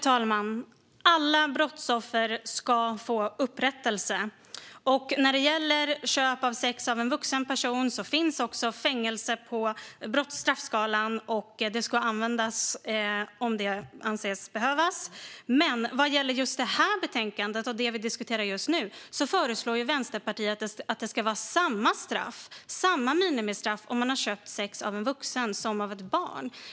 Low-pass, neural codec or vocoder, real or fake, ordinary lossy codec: none; none; real; none